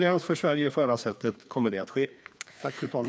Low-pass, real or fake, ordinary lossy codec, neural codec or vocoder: none; fake; none; codec, 16 kHz, 2 kbps, FreqCodec, larger model